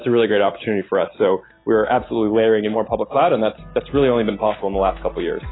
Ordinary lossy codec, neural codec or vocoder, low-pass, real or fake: AAC, 16 kbps; none; 7.2 kHz; real